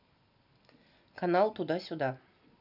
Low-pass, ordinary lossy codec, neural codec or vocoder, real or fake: 5.4 kHz; none; none; real